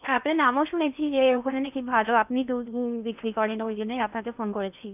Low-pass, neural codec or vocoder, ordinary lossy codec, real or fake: 3.6 kHz; codec, 16 kHz in and 24 kHz out, 0.8 kbps, FocalCodec, streaming, 65536 codes; none; fake